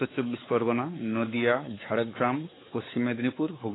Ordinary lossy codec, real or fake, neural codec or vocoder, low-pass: AAC, 16 kbps; fake; codec, 16 kHz, 4 kbps, X-Codec, WavLM features, trained on Multilingual LibriSpeech; 7.2 kHz